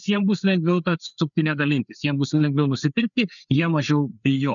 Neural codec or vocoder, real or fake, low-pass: codec, 16 kHz, 4 kbps, FreqCodec, larger model; fake; 7.2 kHz